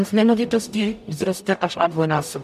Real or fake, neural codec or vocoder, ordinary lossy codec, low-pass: fake; codec, 44.1 kHz, 0.9 kbps, DAC; AAC, 96 kbps; 14.4 kHz